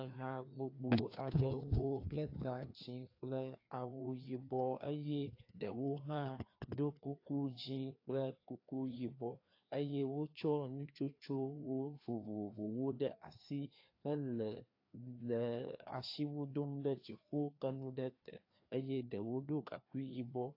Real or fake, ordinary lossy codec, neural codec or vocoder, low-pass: fake; AAC, 32 kbps; codec, 16 kHz, 2 kbps, FreqCodec, larger model; 5.4 kHz